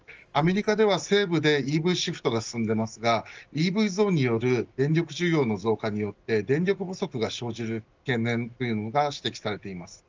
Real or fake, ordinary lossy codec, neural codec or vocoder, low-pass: real; Opus, 24 kbps; none; 7.2 kHz